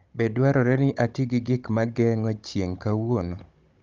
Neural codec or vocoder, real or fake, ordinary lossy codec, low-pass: codec, 16 kHz, 16 kbps, FunCodec, trained on Chinese and English, 50 frames a second; fake; Opus, 32 kbps; 7.2 kHz